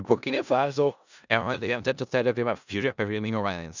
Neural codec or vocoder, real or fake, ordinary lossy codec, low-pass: codec, 16 kHz in and 24 kHz out, 0.4 kbps, LongCat-Audio-Codec, four codebook decoder; fake; none; 7.2 kHz